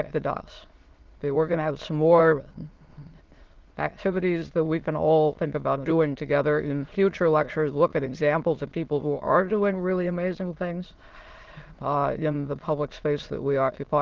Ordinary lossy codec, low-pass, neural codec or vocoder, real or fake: Opus, 16 kbps; 7.2 kHz; autoencoder, 22.05 kHz, a latent of 192 numbers a frame, VITS, trained on many speakers; fake